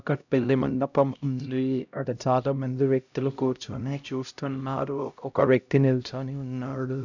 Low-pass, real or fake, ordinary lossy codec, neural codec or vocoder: 7.2 kHz; fake; none; codec, 16 kHz, 0.5 kbps, X-Codec, HuBERT features, trained on LibriSpeech